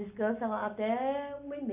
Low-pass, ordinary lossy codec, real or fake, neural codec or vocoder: 3.6 kHz; AAC, 32 kbps; real; none